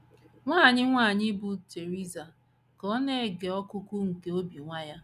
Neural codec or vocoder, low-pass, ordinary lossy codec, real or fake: none; 14.4 kHz; none; real